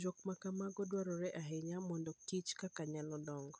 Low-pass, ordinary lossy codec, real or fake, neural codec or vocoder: none; none; real; none